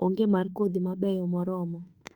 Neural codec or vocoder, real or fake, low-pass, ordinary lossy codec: autoencoder, 48 kHz, 32 numbers a frame, DAC-VAE, trained on Japanese speech; fake; 19.8 kHz; Opus, 64 kbps